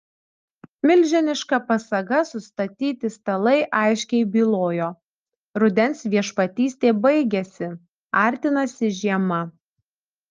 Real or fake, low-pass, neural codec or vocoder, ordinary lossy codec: real; 7.2 kHz; none; Opus, 24 kbps